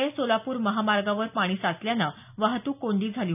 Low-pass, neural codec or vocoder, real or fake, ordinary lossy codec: 3.6 kHz; none; real; none